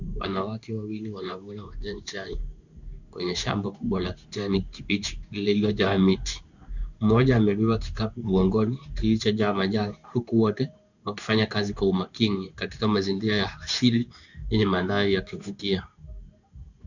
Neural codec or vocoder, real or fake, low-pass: codec, 16 kHz in and 24 kHz out, 1 kbps, XY-Tokenizer; fake; 7.2 kHz